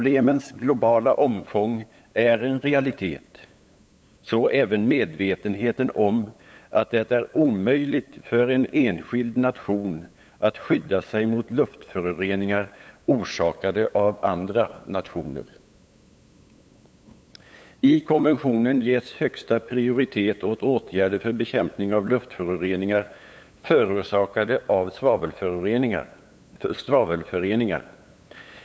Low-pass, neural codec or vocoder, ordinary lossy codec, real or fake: none; codec, 16 kHz, 8 kbps, FunCodec, trained on LibriTTS, 25 frames a second; none; fake